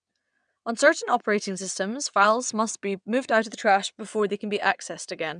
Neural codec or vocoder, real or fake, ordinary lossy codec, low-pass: vocoder, 22.05 kHz, 80 mel bands, WaveNeXt; fake; none; 9.9 kHz